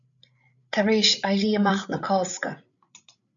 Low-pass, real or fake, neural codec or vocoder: 7.2 kHz; fake; codec, 16 kHz, 8 kbps, FreqCodec, larger model